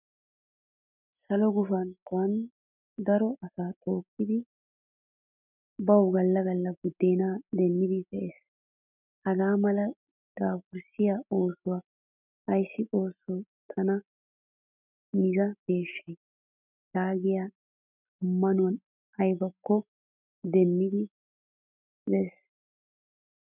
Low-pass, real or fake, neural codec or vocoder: 3.6 kHz; real; none